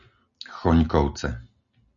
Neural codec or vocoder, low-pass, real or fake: none; 7.2 kHz; real